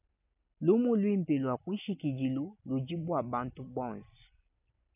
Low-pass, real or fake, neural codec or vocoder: 3.6 kHz; real; none